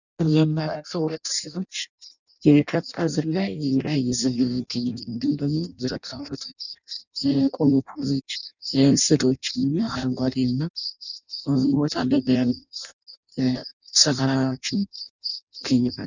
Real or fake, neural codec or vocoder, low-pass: fake; codec, 16 kHz in and 24 kHz out, 0.6 kbps, FireRedTTS-2 codec; 7.2 kHz